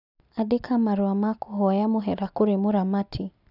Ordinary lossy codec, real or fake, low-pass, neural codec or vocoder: none; real; 5.4 kHz; none